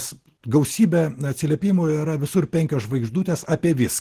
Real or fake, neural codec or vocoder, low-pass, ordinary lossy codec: real; none; 14.4 kHz; Opus, 16 kbps